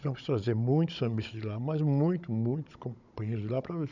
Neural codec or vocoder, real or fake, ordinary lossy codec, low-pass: codec, 16 kHz, 16 kbps, FunCodec, trained on Chinese and English, 50 frames a second; fake; none; 7.2 kHz